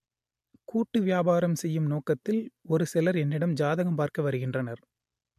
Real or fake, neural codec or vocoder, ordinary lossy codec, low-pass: real; none; MP3, 64 kbps; 14.4 kHz